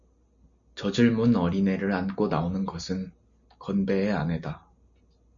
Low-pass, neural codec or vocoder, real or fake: 7.2 kHz; none; real